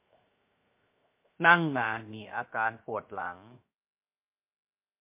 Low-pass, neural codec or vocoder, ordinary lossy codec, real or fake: 3.6 kHz; codec, 16 kHz, 0.7 kbps, FocalCodec; MP3, 24 kbps; fake